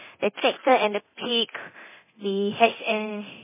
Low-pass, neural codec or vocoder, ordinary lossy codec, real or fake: 3.6 kHz; codec, 24 kHz, 0.9 kbps, DualCodec; MP3, 16 kbps; fake